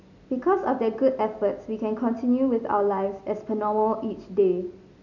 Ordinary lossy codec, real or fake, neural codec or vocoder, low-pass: none; real; none; 7.2 kHz